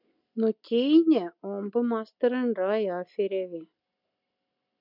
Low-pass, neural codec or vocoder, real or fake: 5.4 kHz; none; real